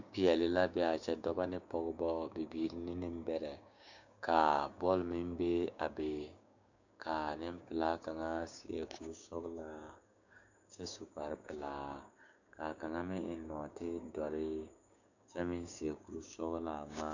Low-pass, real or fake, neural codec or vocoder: 7.2 kHz; fake; codec, 16 kHz, 6 kbps, DAC